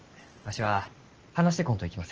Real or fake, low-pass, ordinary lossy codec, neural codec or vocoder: real; 7.2 kHz; Opus, 16 kbps; none